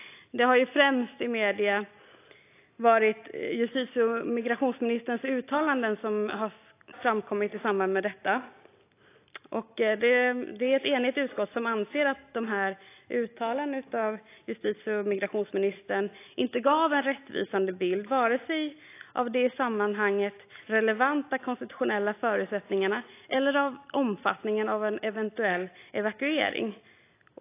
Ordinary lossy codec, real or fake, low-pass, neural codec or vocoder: AAC, 24 kbps; real; 3.6 kHz; none